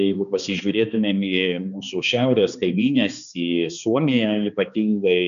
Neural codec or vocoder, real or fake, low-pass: codec, 16 kHz, 2 kbps, X-Codec, HuBERT features, trained on balanced general audio; fake; 7.2 kHz